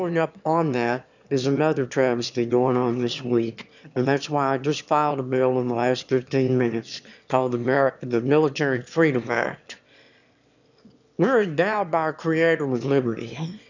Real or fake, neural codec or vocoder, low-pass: fake; autoencoder, 22.05 kHz, a latent of 192 numbers a frame, VITS, trained on one speaker; 7.2 kHz